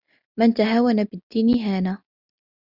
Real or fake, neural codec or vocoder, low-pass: real; none; 5.4 kHz